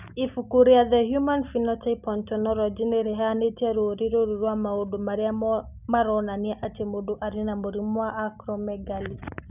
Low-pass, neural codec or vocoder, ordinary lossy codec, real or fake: 3.6 kHz; none; none; real